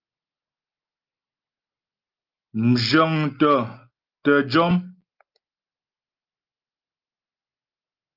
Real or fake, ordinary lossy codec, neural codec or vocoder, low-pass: real; Opus, 32 kbps; none; 5.4 kHz